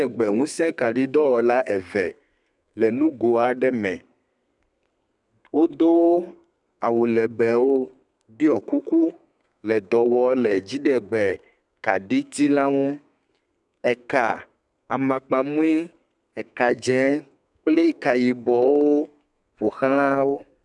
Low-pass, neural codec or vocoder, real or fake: 10.8 kHz; codec, 32 kHz, 1.9 kbps, SNAC; fake